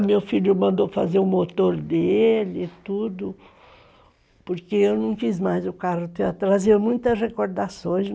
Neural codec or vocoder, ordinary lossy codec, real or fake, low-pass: none; none; real; none